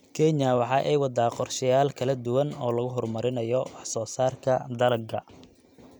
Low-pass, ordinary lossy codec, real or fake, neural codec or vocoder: none; none; real; none